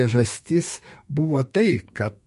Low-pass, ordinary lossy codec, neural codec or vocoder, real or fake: 14.4 kHz; MP3, 48 kbps; codec, 32 kHz, 1.9 kbps, SNAC; fake